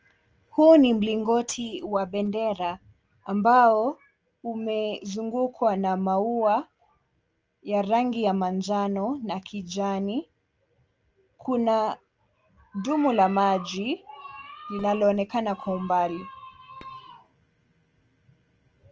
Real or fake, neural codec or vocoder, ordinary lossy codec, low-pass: real; none; Opus, 24 kbps; 7.2 kHz